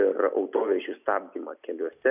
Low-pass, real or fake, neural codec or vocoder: 3.6 kHz; real; none